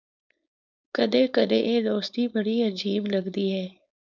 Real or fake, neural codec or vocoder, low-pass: fake; codec, 16 kHz, 4.8 kbps, FACodec; 7.2 kHz